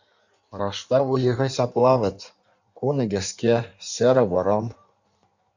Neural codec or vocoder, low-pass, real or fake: codec, 16 kHz in and 24 kHz out, 1.1 kbps, FireRedTTS-2 codec; 7.2 kHz; fake